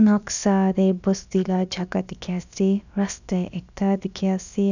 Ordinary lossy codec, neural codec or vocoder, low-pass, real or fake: none; codec, 16 kHz, about 1 kbps, DyCAST, with the encoder's durations; 7.2 kHz; fake